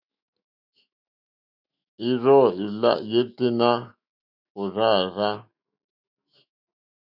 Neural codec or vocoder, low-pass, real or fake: autoencoder, 48 kHz, 128 numbers a frame, DAC-VAE, trained on Japanese speech; 5.4 kHz; fake